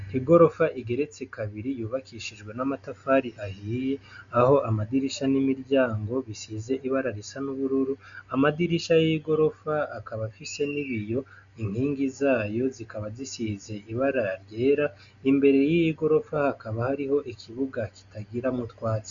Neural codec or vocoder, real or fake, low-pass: none; real; 7.2 kHz